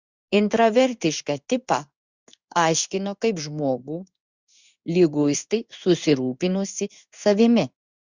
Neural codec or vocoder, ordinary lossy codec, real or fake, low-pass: codec, 16 kHz in and 24 kHz out, 1 kbps, XY-Tokenizer; Opus, 64 kbps; fake; 7.2 kHz